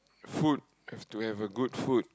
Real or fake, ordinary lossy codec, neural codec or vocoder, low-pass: real; none; none; none